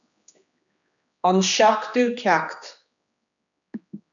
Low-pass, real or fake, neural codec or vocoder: 7.2 kHz; fake; codec, 16 kHz, 2 kbps, X-Codec, HuBERT features, trained on general audio